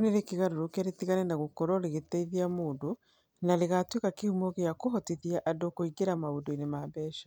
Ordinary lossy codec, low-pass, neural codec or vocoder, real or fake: none; none; none; real